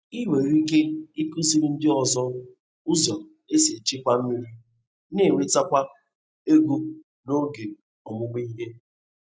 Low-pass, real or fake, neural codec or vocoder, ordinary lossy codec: none; real; none; none